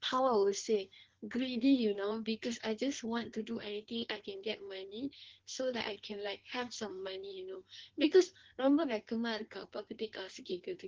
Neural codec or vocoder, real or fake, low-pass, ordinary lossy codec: codec, 16 kHz in and 24 kHz out, 1.1 kbps, FireRedTTS-2 codec; fake; 7.2 kHz; Opus, 16 kbps